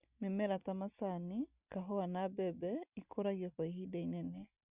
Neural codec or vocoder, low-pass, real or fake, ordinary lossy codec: none; 3.6 kHz; real; Opus, 64 kbps